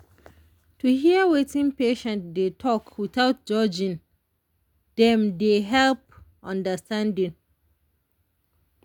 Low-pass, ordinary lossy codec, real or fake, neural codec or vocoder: 19.8 kHz; none; real; none